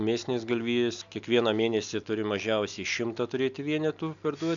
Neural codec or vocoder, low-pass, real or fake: none; 7.2 kHz; real